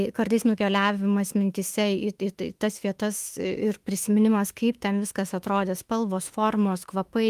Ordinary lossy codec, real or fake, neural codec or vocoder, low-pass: Opus, 32 kbps; fake; autoencoder, 48 kHz, 32 numbers a frame, DAC-VAE, trained on Japanese speech; 14.4 kHz